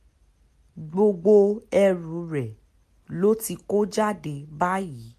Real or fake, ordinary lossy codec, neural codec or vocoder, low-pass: real; MP3, 64 kbps; none; 14.4 kHz